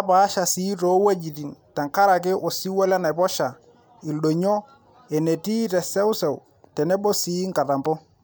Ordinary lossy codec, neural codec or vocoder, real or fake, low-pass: none; none; real; none